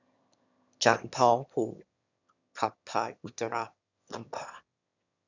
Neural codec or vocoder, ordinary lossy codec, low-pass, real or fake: autoencoder, 22.05 kHz, a latent of 192 numbers a frame, VITS, trained on one speaker; none; 7.2 kHz; fake